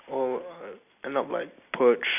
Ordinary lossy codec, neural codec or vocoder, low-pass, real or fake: none; none; 3.6 kHz; real